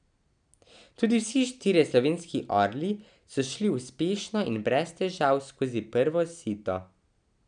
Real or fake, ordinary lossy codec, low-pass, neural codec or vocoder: real; none; 9.9 kHz; none